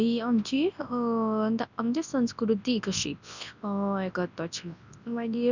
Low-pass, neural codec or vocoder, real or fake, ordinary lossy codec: 7.2 kHz; codec, 24 kHz, 0.9 kbps, WavTokenizer, large speech release; fake; none